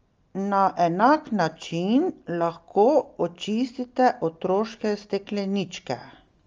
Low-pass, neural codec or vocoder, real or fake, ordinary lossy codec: 7.2 kHz; none; real; Opus, 32 kbps